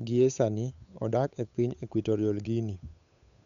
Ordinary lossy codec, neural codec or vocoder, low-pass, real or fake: none; codec, 16 kHz, 8 kbps, FunCodec, trained on Chinese and English, 25 frames a second; 7.2 kHz; fake